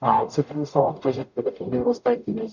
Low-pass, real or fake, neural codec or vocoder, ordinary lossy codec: 7.2 kHz; fake; codec, 44.1 kHz, 0.9 kbps, DAC; none